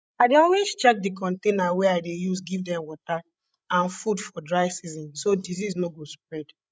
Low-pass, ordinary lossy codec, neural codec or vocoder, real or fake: none; none; codec, 16 kHz, 16 kbps, FreqCodec, larger model; fake